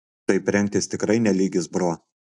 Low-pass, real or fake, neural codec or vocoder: 10.8 kHz; real; none